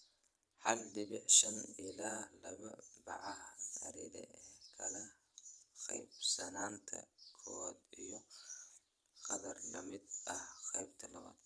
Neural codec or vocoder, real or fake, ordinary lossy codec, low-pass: vocoder, 22.05 kHz, 80 mel bands, Vocos; fake; none; none